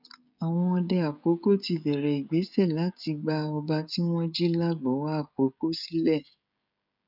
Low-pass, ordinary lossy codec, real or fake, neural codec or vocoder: 5.4 kHz; none; fake; codec, 16 kHz, 16 kbps, FreqCodec, smaller model